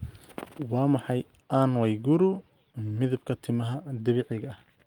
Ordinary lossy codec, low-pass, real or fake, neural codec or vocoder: Opus, 32 kbps; 19.8 kHz; real; none